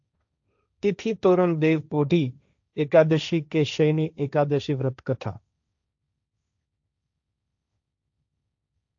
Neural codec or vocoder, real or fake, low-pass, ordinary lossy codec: codec, 16 kHz, 1.1 kbps, Voila-Tokenizer; fake; 7.2 kHz; AAC, 64 kbps